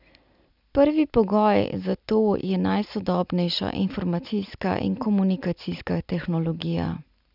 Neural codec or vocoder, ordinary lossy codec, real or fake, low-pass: none; none; real; 5.4 kHz